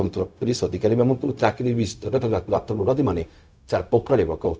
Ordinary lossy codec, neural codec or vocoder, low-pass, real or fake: none; codec, 16 kHz, 0.4 kbps, LongCat-Audio-Codec; none; fake